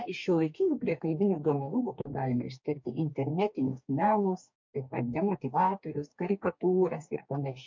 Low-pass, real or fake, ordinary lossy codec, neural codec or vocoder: 7.2 kHz; fake; MP3, 48 kbps; codec, 44.1 kHz, 2.6 kbps, DAC